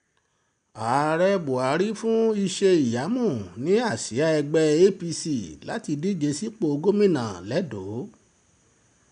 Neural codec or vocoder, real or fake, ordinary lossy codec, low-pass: none; real; none; 9.9 kHz